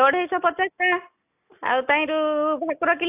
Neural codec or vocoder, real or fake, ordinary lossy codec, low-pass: none; real; AAC, 32 kbps; 3.6 kHz